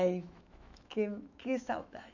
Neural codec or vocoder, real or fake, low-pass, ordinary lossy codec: autoencoder, 48 kHz, 128 numbers a frame, DAC-VAE, trained on Japanese speech; fake; 7.2 kHz; none